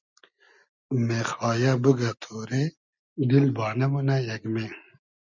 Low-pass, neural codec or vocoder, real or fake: 7.2 kHz; none; real